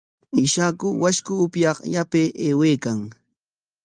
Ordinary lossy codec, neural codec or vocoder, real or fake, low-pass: Opus, 32 kbps; none; real; 9.9 kHz